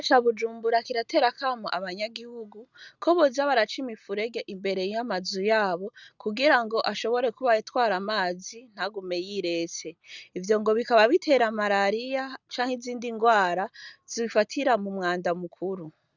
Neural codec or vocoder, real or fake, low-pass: none; real; 7.2 kHz